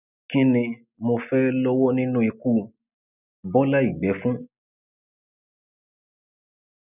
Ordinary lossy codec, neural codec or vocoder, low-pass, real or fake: none; none; 3.6 kHz; real